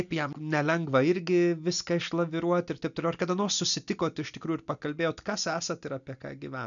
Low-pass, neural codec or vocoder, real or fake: 7.2 kHz; none; real